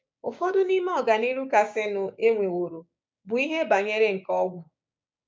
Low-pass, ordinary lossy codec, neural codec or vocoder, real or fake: none; none; codec, 16 kHz, 6 kbps, DAC; fake